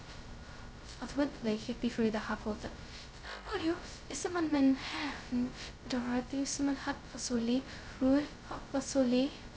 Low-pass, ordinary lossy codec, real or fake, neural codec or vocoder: none; none; fake; codec, 16 kHz, 0.2 kbps, FocalCodec